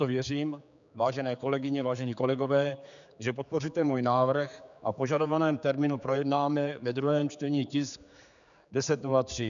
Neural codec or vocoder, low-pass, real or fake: codec, 16 kHz, 4 kbps, X-Codec, HuBERT features, trained on general audio; 7.2 kHz; fake